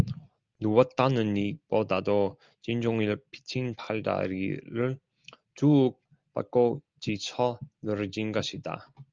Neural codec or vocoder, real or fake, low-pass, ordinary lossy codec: none; real; 7.2 kHz; Opus, 24 kbps